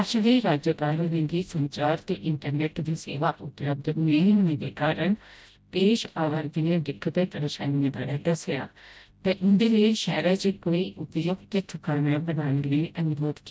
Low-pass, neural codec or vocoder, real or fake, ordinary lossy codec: none; codec, 16 kHz, 0.5 kbps, FreqCodec, smaller model; fake; none